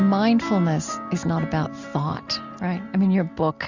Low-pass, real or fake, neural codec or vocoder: 7.2 kHz; real; none